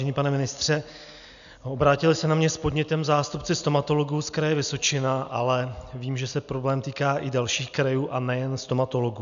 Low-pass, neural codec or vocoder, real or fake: 7.2 kHz; none; real